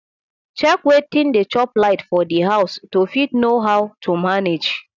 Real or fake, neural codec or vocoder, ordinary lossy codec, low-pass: real; none; none; 7.2 kHz